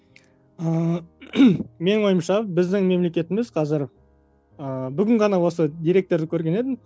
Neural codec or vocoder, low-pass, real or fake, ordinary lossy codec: none; none; real; none